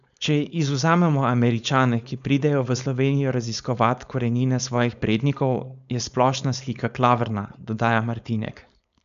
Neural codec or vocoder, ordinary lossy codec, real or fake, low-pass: codec, 16 kHz, 4.8 kbps, FACodec; none; fake; 7.2 kHz